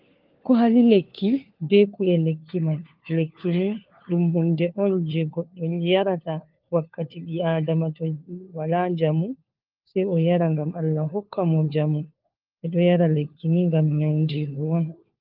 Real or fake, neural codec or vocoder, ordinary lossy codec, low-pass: fake; codec, 16 kHz, 4 kbps, FunCodec, trained on LibriTTS, 50 frames a second; Opus, 32 kbps; 5.4 kHz